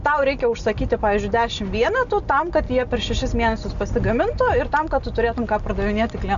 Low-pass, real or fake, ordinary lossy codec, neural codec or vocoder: 7.2 kHz; real; MP3, 96 kbps; none